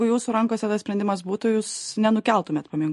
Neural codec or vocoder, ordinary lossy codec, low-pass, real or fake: none; MP3, 48 kbps; 14.4 kHz; real